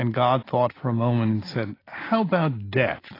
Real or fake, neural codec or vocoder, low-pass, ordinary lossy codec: real; none; 5.4 kHz; AAC, 24 kbps